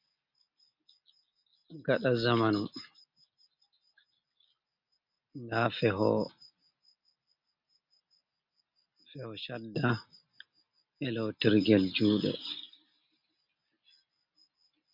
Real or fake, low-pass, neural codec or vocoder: real; 5.4 kHz; none